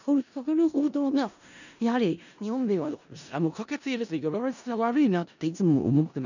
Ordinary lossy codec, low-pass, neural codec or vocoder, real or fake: none; 7.2 kHz; codec, 16 kHz in and 24 kHz out, 0.4 kbps, LongCat-Audio-Codec, four codebook decoder; fake